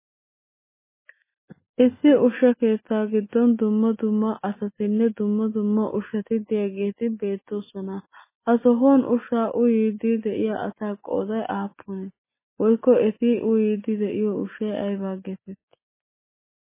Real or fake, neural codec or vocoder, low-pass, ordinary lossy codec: real; none; 3.6 kHz; MP3, 16 kbps